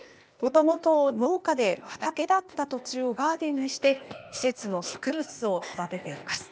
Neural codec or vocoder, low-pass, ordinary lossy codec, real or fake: codec, 16 kHz, 0.8 kbps, ZipCodec; none; none; fake